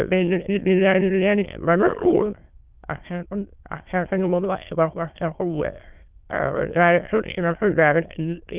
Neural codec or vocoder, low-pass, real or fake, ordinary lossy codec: autoencoder, 22.05 kHz, a latent of 192 numbers a frame, VITS, trained on many speakers; 3.6 kHz; fake; Opus, 24 kbps